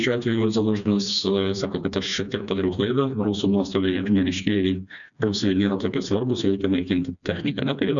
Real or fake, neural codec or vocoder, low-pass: fake; codec, 16 kHz, 2 kbps, FreqCodec, smaller model; 7.2 kHz